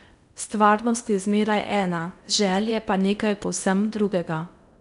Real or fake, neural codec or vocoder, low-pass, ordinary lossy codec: fake; codec, 16 kHz in and 24 kHz out, 0.8 kbps, FocalCodec, streaming, 65536 codes; 10.8 kHz; none